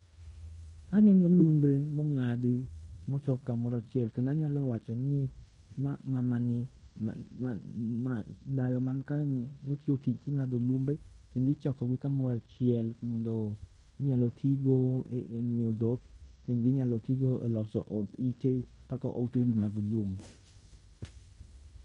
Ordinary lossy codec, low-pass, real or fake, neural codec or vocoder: MP3, 48 kbps; 10.8 kHz; fake; codec, 16 kHz in and 24 kHz out, 0.9 kbps, LongCat-Audio-Codec, fine tuned four codebook decoder